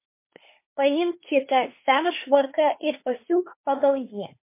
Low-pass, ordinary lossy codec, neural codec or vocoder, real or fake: 3.6 kHz; MP3, 24 kbps; codec, 24 kHz, 1 kbps, SNAC; fake